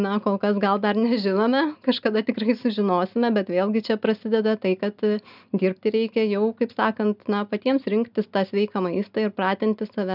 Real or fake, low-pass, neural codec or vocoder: real; 5.4 kHz; none